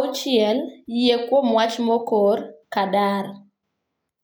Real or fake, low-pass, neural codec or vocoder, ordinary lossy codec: real; none; none; none